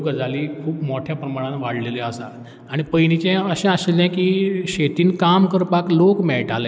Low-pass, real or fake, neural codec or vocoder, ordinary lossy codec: none; real; none; none